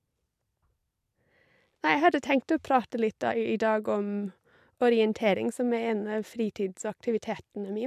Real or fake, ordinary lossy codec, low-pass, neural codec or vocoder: fake; MP3, 96 kbps; 14.4 kHz; vocoder, 44.1 kHz, 128 mel bands every 256 samples, BigVGAN v2